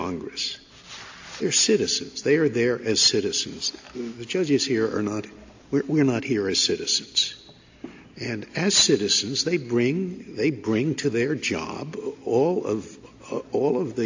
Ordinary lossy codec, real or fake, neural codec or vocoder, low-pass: AAC, 48 kbps; real; none; 7.2 kHz